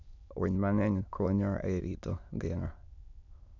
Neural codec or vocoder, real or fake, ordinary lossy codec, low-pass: autoencoder, 22.05 kHz, a latent of 192 numbers a frame, VITS, trained on many speakers; fake; none; 7.2 kHz